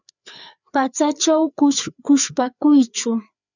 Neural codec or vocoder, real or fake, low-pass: codec, 16 kHz, 4 kbps, FreqCodec, larger model; fake; 7.2 kHz